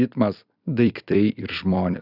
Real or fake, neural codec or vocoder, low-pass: fake; vocoder, 44.1 kHz, 128 mel bands every 256 samples, BigVGAN v2; 5.4 kHz